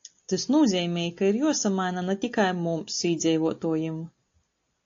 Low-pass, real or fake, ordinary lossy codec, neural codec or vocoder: 7.2 kHz; real; AAC, 48 kbps; none